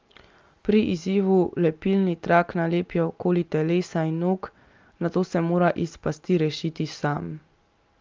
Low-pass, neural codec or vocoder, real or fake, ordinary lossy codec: 7.2 kHz; none; real; Opus, 32 kbps